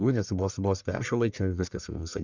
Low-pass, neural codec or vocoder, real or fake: 7.2 kHz; codec, 44.1 kHz, 1.7 kbps, Pupu-Codec; fake